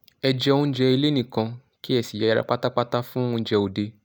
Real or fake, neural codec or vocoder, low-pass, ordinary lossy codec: real; none; none; none